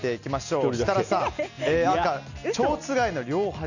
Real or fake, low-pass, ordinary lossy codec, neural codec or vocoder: real; 7.2 kHz; none; none